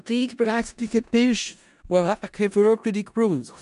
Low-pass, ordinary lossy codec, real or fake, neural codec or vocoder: 10.8 kHz; none; fake; codec, 16 kHz in and 24 kHz out, 0.4 kbps, LongCat-Audio-Codec, four codebook decoder